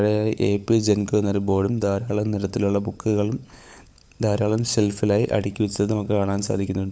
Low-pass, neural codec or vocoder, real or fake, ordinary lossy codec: none; codec, 16 kHz, 16 kbps, FunCodec, trained on LibriTTS, 50 frames a second; fake; none